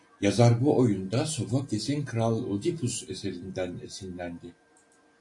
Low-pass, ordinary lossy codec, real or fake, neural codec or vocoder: 10.8 kHz; AAC, 48 kbps; real; none